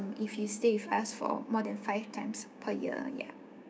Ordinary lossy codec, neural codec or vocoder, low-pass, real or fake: none; codec, 16 kHz, 6 kbps, DAC; none; fake